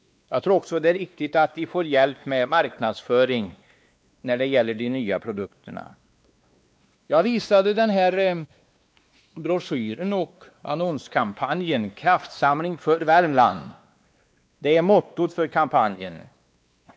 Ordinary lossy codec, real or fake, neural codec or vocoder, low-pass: none; fake; codec, 16 kHz, 2 kbps, X-Codec, WavLM features, trained on Multilingual LibriSpeech; none